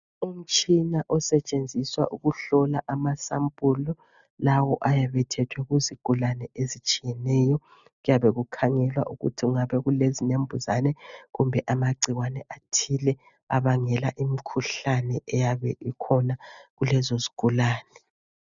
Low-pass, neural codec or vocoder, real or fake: 7.2 kHz; none; real